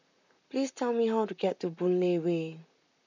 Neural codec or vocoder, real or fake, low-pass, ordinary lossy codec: vocoder, 44.1 kHz, 128 mel bands, Pupu-Vocoder; fake; 7.2 kHz; none